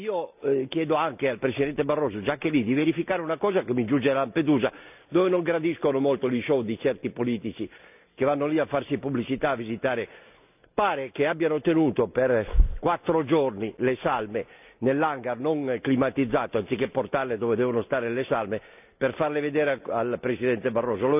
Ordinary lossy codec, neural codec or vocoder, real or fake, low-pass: none; none; real; 3.6 kHz